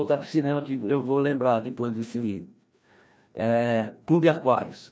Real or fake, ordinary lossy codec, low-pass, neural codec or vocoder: fake; none; none; codec, 16 kHz, 1 kbps, FreqCodec, larger model